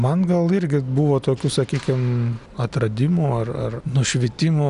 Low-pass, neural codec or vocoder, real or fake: 10.8 kHz; none; real